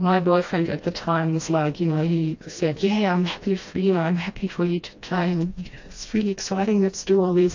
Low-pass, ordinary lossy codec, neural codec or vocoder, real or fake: 7.2 kHz; AAC, 48 kbps; codec, 16 kHz, 1 kbps, FreqCodec, smaller model; fake